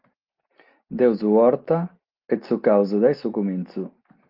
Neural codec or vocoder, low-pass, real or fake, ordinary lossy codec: none; 5.4 kHz; real; Opus, 32 kbps